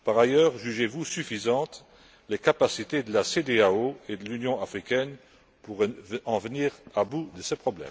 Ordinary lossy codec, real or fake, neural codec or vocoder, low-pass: none; real; none; none